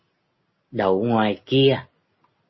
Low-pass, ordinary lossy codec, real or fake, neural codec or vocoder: 7.2 kHz; MP3, 24 kbps; real; none